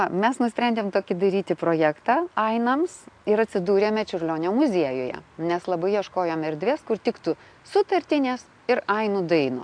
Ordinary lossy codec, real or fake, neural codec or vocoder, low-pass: AAC, 64 kbps; real; none; 9.9 kHz